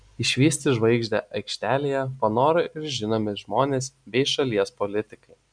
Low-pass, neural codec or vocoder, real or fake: 9.9 kHz; none; real